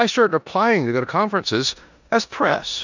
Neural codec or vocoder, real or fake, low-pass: codec, 16 kHz in and 24 kHz out, 0.9 kbps, LongCat-Audio-Codec, four codebook decoder; fake; 7.2 kHz